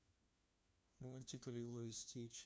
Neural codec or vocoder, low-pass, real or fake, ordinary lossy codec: codec, 16 kHz, 1 kbps, FunCodec, trained on LibriTTS, 50 frames a second; none; fake; none